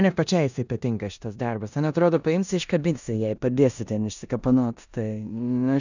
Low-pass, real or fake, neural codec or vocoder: 7.2 kHz; fake; codec, 16 kHz in and 24 kHz out, 0.9 kbps, LongCat-Audio-Codec, four codebook decoder